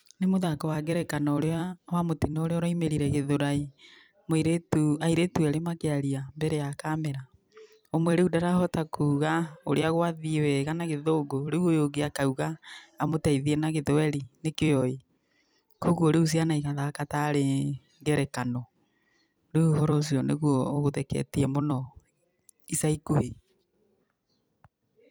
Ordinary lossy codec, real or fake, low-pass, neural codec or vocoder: none; fake; none; vocoder, 44.1 kHz, 128 mel bands every 256 samples, BigVGAN v2